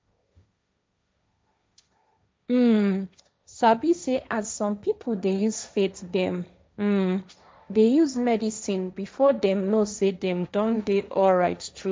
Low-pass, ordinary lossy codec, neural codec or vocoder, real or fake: none; none; codec, 16 kHz, 1.1 kbps, Voila-Tokenizer; fake